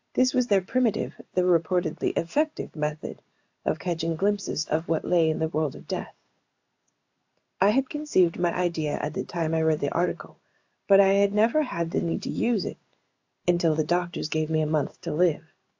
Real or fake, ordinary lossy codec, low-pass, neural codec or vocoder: fake; AAC, 48 kbps; 7.2 kHz; codec, 16 kHz in and 24 kHz out, 1 kbps, XY-Tokenizer